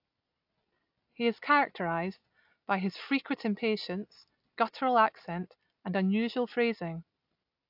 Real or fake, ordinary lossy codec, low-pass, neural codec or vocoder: real; AAC, 48 kbps; 5.4 kHz; none